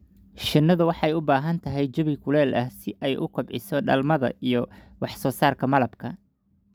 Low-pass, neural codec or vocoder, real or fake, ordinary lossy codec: none; none; real; none